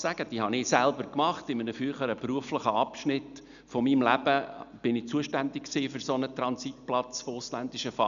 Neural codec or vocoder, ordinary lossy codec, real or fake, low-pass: none; none; real; 7.2 kHz